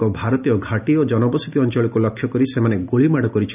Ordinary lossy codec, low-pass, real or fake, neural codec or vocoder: none; 3.6 kHz; real; none